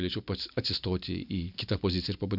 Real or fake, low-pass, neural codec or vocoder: real; 5.4 kHz; none